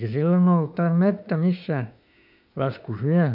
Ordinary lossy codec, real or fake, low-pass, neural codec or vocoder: none; fake; 5.4 kHz; autoencoder, 48 kHz, 32 numbers a frame, DAC-VAE, trained on Japanese speech